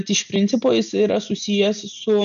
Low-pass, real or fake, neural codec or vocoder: 7.2 kHz; real; none